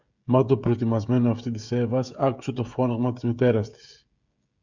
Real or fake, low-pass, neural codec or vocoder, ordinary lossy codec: fake; 7.2 kHz; codec, 16 kHz, 16 kbps, FreqCodec, smaller model; Opus, 64 kbps